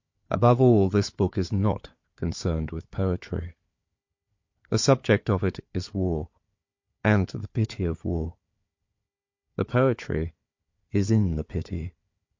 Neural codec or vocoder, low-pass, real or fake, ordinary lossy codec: codec, 16 kHz, 4 kbps, FunCodec, trained on Chinese and English, 50 frames a second; 7.2 kHz; fake; MP3, 48 kbps